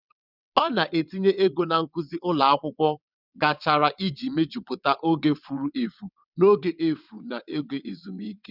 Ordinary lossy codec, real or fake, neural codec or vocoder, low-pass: none; fake; vocoder, 22.05 kHz, 80 mel bands, WaveNeXt; 5.4 kHz